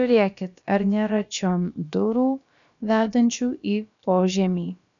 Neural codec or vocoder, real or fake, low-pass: codec, 16 kHz, about 1 kbps, DyCAST, with the encoder's durations; fake; 7.2 kHz